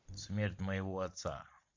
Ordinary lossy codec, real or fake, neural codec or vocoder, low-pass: AAC, 48 kbps; fake; vocoder, 44.1 kHz, 128 mel bands every 512 samples, BigVGAN v2; 7.2 kHz